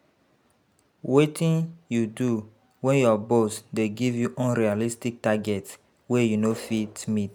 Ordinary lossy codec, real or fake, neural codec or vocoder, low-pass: none; real; none; none